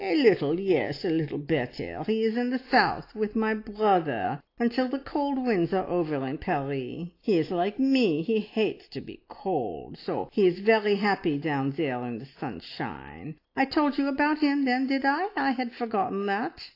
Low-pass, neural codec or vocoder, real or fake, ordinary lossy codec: 5.4 kHz; none; real; AAC, 32 kbps